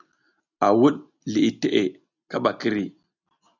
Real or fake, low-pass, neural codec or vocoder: real; 7.2 kHz; none